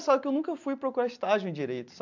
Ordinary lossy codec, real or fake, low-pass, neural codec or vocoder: MP3, 64 kbps; real; 7.2 kHz; none